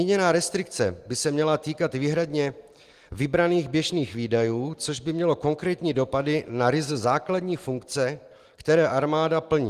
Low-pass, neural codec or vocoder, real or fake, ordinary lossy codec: 14.4 kHz; none; real; Opus, 24 kbps